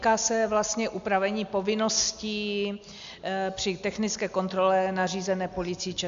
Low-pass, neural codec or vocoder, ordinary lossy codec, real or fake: 7.2 kHz; none; MP3, 64 kbps; real